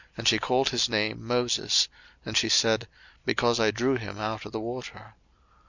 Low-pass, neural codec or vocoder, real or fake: 7.2 kHz; none; real